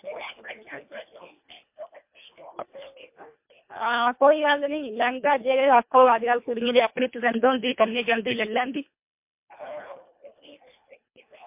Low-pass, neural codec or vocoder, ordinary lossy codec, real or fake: 3.6 kHz; codec, 24 kHz, 1.5 kbps, HILCodec; MP3, 32 kbps; fake